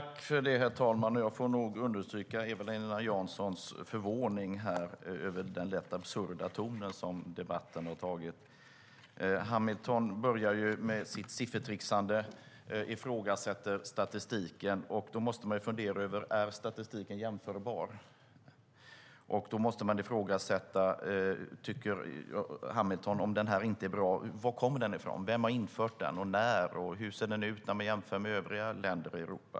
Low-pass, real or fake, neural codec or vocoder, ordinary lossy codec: none; real; none; none